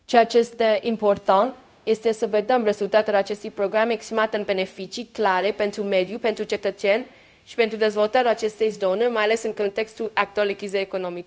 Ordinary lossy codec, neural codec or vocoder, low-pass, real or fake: none; codec, 16 kHz, 0.4 kbps, LongCat-Audio-Codec; none; fake